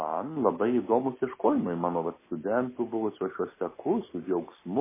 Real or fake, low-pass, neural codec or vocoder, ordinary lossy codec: real; 3.6 kHz; none; MP3, 16 kbps